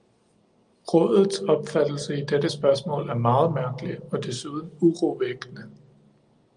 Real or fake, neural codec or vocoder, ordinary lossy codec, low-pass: real; none; Opus, 32 kbps; 9.9 kHz